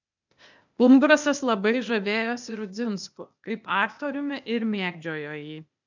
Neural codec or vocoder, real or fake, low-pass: codec, 16 kHz, 0.8 kbps, ZipCodec; fake; 7.2 kHz